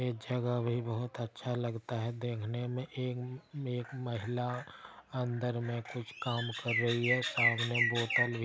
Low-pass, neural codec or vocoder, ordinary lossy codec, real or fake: none; none; none; real